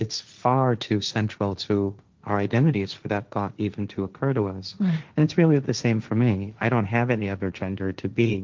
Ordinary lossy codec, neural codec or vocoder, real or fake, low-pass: Opus, 24 kbps; codec, 16 kHz, 1.1 kbps, Voila-Tokenizer; fake; 7.2 kHz